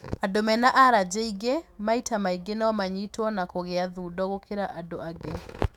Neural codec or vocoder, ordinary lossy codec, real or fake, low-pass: autoencoder, 48 kHz, 128 numbers a frame, DAC-VAE, trained on Japanese speech; none; fake; 19.8 kHz